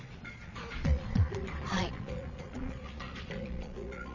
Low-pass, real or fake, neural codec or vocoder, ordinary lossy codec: 7.2 kHz; fake; vocoder, 22.05 kHz, 80 mel bands, Vocos; none